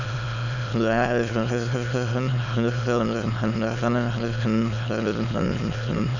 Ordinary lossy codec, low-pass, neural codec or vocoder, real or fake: none; 7.2 kHz; autoencoder, 22.05 kHz, a latent of 192 numbers a frame, VITS, trained on many speakers; fake